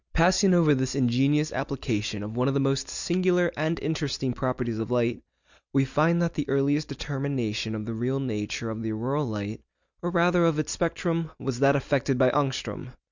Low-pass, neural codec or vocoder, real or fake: 7.2 kHz; none; real